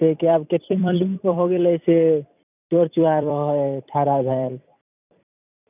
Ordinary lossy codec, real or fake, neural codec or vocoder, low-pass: none; fake; vocoder, 44.1 kHz, 128 mel bands every 256 samples, BigVGAN v2; 3.6 kHz